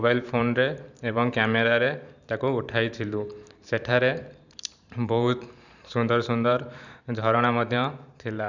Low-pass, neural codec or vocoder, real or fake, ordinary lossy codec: 7.2 kHz; vocoder, 44.1 kHz, 128 mel bands every 512 samples, BigVGAN v2; fake; none